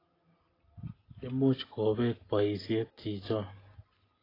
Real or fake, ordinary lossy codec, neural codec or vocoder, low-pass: real; AAC, 24 kbps; none; 5.4 kHz